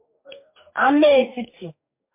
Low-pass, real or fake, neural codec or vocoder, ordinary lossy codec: 3.6 kHz; fake; codec, 44.1 kHz, 2.6 kbps, DAC; MP3, 32 kbps